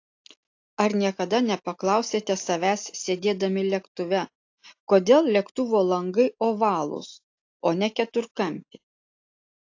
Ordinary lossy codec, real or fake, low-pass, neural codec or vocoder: AAC, 48 kbps; real; 7.2 kHz; none